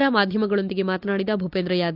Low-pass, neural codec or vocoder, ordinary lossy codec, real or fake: 5.4 kHz; none; none; real